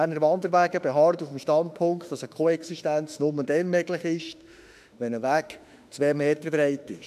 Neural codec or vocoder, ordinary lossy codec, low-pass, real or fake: autoencoder, 48 kHz, 32 numbers a frame, DAC-VAE, trained on Japanese speech; none; 14.4 kHz; fake